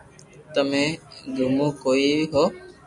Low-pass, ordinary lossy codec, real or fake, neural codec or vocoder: 10.8 kHz; MP3, 96 kbps; real; none